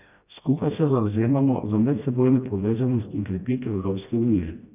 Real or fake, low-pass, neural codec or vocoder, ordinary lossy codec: fake; 3.6 kHz; codec, 16 kHz, 1 kbps, FreqCodec, smaller model; none